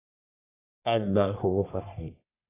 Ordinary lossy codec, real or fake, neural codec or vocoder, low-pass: AAC, 16 kbps; fake; codec, 44.1 kHz, 1.7 kbps, Pupu-Codec; 3.6 kHz